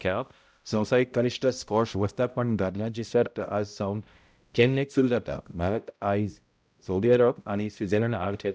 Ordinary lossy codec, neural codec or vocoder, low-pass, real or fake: none; codec, 16 kHz, 0.5 kbps, X-Codec, HuBERT features, trained on balanced general audio; none; fake